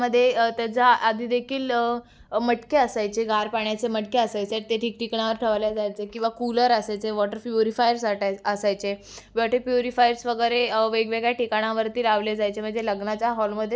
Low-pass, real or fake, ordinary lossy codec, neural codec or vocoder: none; real; none; none